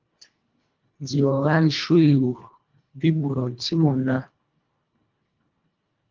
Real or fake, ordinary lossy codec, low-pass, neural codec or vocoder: fake; Opus, 32 kbps; 7.2 kHz; codec, 24 kHz, 1.5 kbps, HILCodec